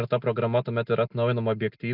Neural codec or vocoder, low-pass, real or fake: none; 5.4 kHz; real